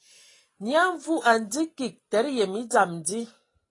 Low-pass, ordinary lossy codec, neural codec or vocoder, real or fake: 10.8 kHz; AAC, 32 kbps; none; real